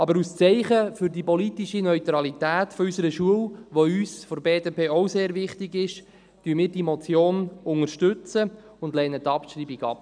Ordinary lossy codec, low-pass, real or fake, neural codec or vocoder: none; 9.9 kHz; real; none